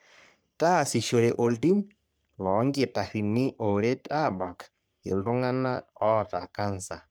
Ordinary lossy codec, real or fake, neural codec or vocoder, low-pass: none; fake; codec, 44.1 kHz, 3.4 kbps, Pupu-Codec; none